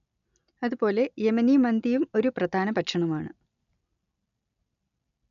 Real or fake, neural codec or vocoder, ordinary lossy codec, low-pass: real; none; none; 7.2 kHz